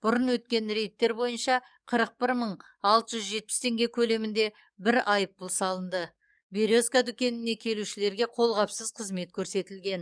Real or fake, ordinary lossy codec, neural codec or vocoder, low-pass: fake; none; codec, 44.1 kHz, 7.8 kbps, DAC; 9.9 kHz